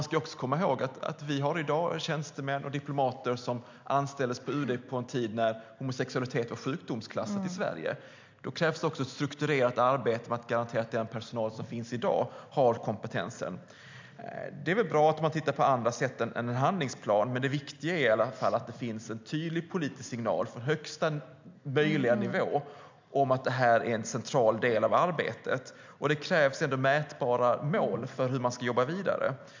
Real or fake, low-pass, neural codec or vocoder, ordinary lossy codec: real; 7.2 kHz; none; MP3, 64 kbps